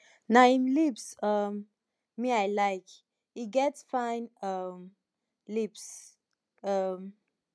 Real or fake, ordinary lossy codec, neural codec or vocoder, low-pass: real; none; none; none